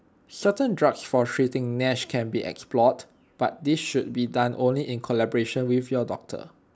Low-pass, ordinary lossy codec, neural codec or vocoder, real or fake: none; none; none; real